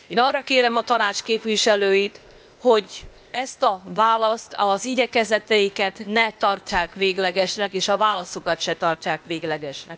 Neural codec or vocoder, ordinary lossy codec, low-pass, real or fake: codec, 16 kHz, 0.8 kbps, ZipCodec; none; none; fake